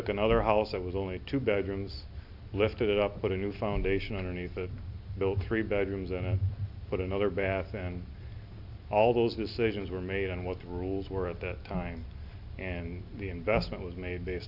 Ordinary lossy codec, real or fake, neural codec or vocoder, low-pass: AAC, 48 kbps; real; none; 5.4 kHz